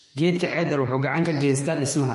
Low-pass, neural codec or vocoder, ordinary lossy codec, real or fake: 14.4 kHz; autoencoder, 48 kHz, 32 numbers a frame, DAC-VAE, trained on Japanese speech; MP3, 48 kbps; fake